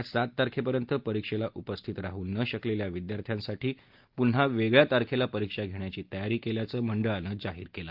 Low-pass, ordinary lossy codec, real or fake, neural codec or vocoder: 5.4 kHz; Opus, 32 kbps; real; none